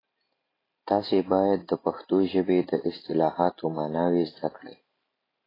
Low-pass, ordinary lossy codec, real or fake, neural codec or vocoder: 5.4 kHz; AAC, 24 kbps; real; none